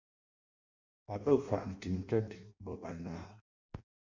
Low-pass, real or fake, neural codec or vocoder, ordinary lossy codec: 7.2 kHz; fake; codec, 16 kHz in and 24 kHz out, 0.6 kbps, FireRedTTS-2 codec; Opus, 64 kbps